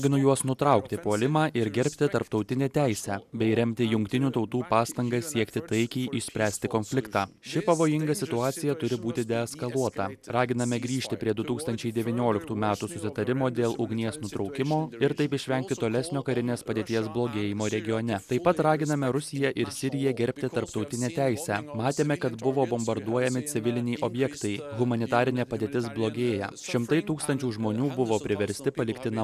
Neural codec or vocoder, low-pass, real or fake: none; 14.4 kHz; real